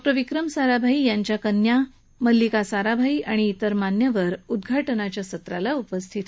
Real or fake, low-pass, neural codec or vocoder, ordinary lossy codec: real; none; none; none